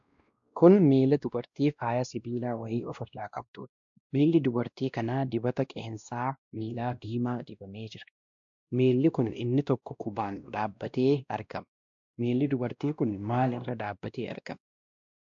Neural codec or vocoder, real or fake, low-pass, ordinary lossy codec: codec, 16 kHz, 1 kbps, X-Codec, WavLM features, trained on Multilingual LibriSpeech; fake; 7.2 kHz; AAC, 64 kbps